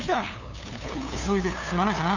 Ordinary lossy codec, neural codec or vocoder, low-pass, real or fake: none; codec, 16 kHz, 2 kbps, FunCodec, trained on LibriTTS, 25 frames a second; 7.2 kHz; fake